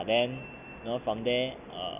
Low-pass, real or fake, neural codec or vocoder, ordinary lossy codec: 3.6 kHz; real; none; none